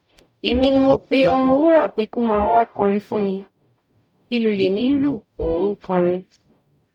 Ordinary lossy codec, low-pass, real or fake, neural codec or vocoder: none; 19.8 kHz; fake; codec, 44.1 kHz, 0.9 kbps, DAC